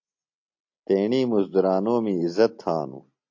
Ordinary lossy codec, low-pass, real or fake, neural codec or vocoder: AAC, 48 kbps; 7.2 kHz; real; none